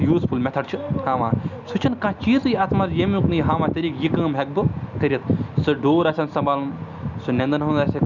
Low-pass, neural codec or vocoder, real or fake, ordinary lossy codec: 7.2 kHz; none; real; none